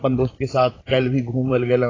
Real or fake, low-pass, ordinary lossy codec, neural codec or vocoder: fake; 7.2 kHz; AAC, 32 kbps; vocoder, 44.1 kHz, 128 mel bands, Pupu-Vocoder